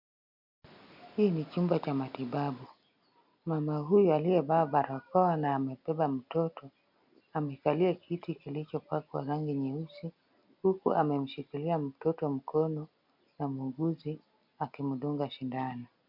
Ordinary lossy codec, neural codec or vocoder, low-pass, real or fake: MP3, 48 kbps; none; 5.4 kHz; real